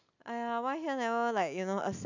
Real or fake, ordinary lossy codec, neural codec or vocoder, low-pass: real; none; none; 7.2 kHz